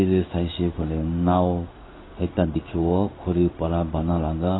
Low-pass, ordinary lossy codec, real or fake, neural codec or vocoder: 7.2 kHz; AAC, 16 kbps; fake; codec, 16 kHz in and 24 kHz out, 1 kbps, XY-Tokenizer